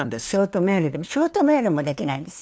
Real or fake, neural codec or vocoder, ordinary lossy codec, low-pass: fake; codec, 16 kHz, 2 kbps, FunCodec, trained on LibriTTS, 25 frames a second; none; none